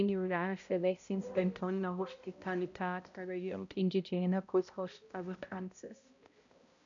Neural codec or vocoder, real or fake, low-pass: codec, 16 kHz, 0.5 kbps, X-Codec, HuBERT features, trained on balanced general audio; fake; 7.2 kHz